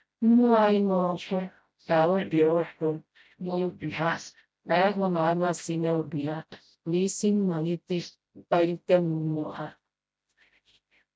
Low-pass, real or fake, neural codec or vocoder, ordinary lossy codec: none; fake; codec, 16 kHz, 0.5 kbps, FreqCodec, smaller model; none